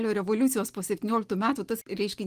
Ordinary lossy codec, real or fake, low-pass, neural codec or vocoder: Opus, 24 kbps; real; 14.4 kHz; none